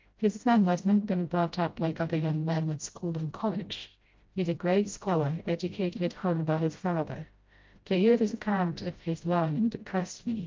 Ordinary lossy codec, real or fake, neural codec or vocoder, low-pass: Opus, 24 kbps; fake; codec, 16 kHz, 0.5 kbps, FreqCodec, smaller model; 7.2 kHz